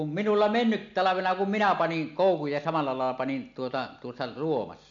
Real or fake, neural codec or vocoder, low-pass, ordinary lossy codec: real; none; 7.2 kHz; MP3, 64 kbps